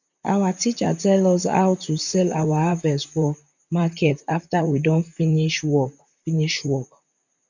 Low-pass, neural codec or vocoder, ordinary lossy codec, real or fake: 7.2 kHz; vocoder, 44.1 kHz, 128 mel bands, Pupu-Vocoder; none; fake